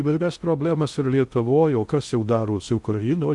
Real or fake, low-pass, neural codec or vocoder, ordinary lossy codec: fake; 10.8 kHz; codec, 16 kHz in and 24 kHz out, 0.6 kbps, FocalCodec, streaming, 2048 codes; Opus, 64 kbps